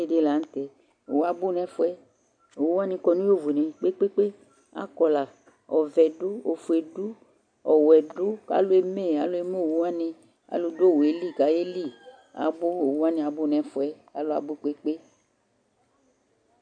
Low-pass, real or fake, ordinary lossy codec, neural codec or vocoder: 9.9 kHz; real; AAC, 64 kbps; none